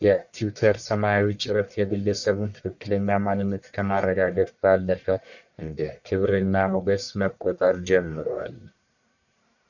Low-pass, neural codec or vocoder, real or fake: 7.2 kHz; codec, 44.1 kHz, 1.7 kbps, Pupu-Codec; fake